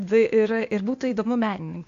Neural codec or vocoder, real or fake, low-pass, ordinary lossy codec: codec, 16 kHz, 0.8 kbps, ZipCodec; fake; 7.2 kHz; AAC, 64 kbps